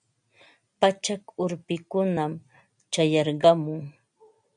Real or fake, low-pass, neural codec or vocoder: real; 9.9 kHz; none